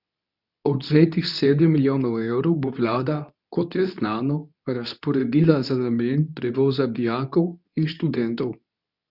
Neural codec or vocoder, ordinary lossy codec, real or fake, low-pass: codec, 24 kHz, 0.9 kbps, WavTokenizer, medium speech release version 2; none; fake; 5.4 kHz